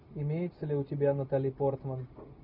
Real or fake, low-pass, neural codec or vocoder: real; 5.4 kHz; none